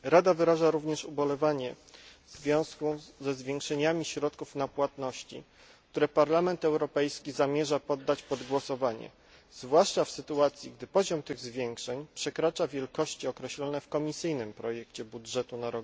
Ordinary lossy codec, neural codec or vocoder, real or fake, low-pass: none; none; real; none